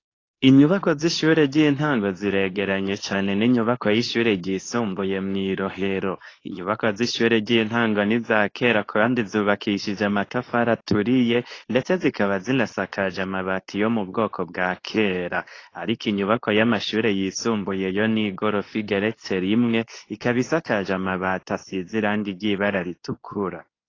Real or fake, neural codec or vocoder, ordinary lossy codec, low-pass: fake; codec, 24 kHz, 0.9 kbps, WavTokenizer, medium speech release version 2; AAC, 32 kbps; 7.2 kHz